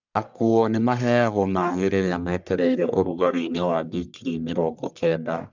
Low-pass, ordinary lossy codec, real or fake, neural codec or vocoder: 7.2 kHz; none; fake; codec, 44.1 kHz, 1.7 kbps, Pupu-Codec